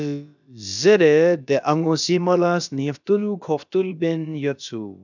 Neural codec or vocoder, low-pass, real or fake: codec, 16 kHz, about 1 kbps, DyCAST, with the encoder's durations; 7.2 kHz; fake